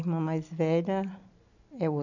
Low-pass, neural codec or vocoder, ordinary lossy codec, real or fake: 7.2 kHz; none; none; real